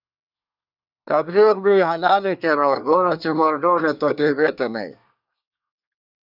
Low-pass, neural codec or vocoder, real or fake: 5.4 kHz; codec, 24 kHz, 1 kbps, SNAC; fake